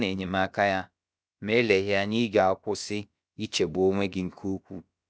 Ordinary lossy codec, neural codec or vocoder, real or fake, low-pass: none; codec, 16 kHz, about 1 kbps, DyCAST, with the encoder's durations; fake; none